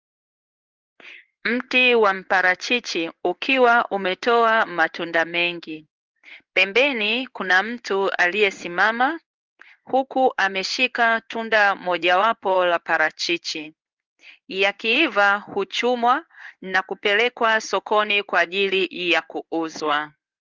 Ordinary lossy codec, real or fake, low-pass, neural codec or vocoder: Opus, 32 kbps; fake; 7.2 kHz; codec, 16 kHz in and 24 kHz out, 1 kbps, XY-Tokenizer